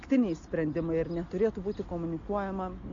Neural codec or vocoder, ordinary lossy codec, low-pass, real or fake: none; MP3, 48 kbps; 7.2 kHz; real